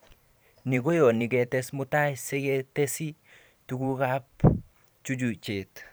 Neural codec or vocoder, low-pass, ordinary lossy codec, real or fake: vocoder, 44.1 kHz, 128 mel bands every 512 samples, BigVGAN v2; none; none; fake